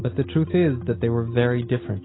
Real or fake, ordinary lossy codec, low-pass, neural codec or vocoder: real; AAC, 16 kbps; 7.2 kHz; none